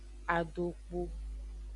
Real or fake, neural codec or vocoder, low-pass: real; none; 10.8 kHz